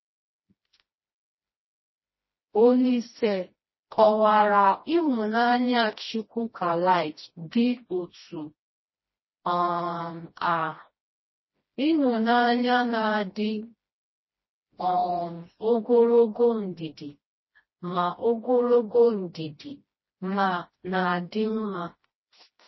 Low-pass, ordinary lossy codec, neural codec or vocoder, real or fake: 7.2 kHz; MP3, 24 kbps; codec, 16 kHz, 1 kbps, FreqCodec, smaller model; fake